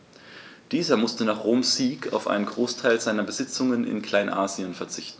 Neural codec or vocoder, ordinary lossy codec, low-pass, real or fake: none; none; none; real